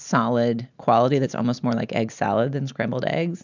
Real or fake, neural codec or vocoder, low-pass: real; none; 7.2 kHz